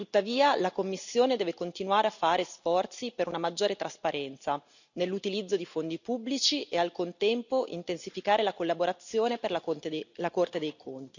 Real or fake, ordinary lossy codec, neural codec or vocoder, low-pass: real; none; none; 7.2 kHz